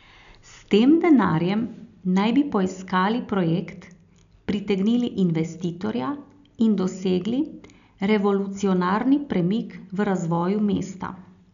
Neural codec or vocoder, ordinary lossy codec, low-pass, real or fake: none; none; 7.2 kHz; real